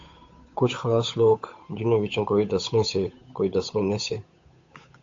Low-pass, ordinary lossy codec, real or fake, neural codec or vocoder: 7.2 kHz; AAC, 48 kbps; fake; codec, 16 kHz, 8 kbps, FunCodec, trained on Chinese and English, 25 frames a second